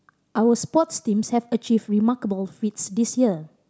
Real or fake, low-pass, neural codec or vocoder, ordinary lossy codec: real; none; none; none